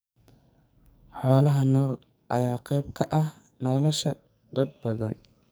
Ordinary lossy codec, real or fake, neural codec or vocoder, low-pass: none; fake; codec, 44.1 kHz, 2.6 kbps, SNAC; none